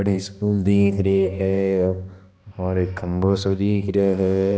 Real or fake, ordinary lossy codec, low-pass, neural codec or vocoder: fake; none; none; codec, 16 kHz, 1 kbps, X-Codec, HuBERT features, trained on balanced general audio